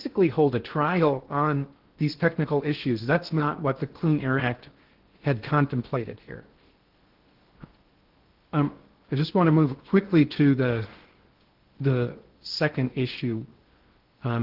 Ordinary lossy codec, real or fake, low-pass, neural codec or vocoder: Opus, 16 kbps; fake; 5.4 kHz; codec, 16 kHz in and 24 kHz out, 0.6 kbps, FocalCodec, streaming, 2048 codes